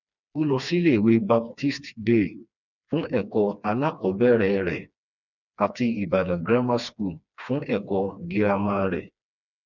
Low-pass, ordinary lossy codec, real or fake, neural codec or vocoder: 7.2 kHz; none; fake; codec, 16 kHz, 2 kbps, FreqCodec, smaller model